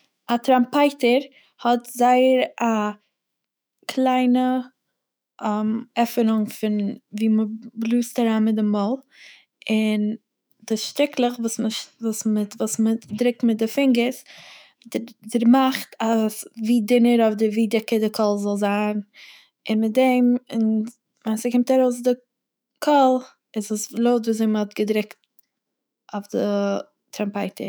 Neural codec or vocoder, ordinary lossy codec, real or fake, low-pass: autoencoder, 48 kHz, 128 numbers a frame, DAC-VAE, trained on Japanese speech; none; fake; none